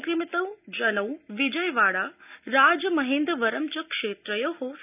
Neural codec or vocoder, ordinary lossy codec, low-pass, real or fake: none; none; 3.6 kHz; real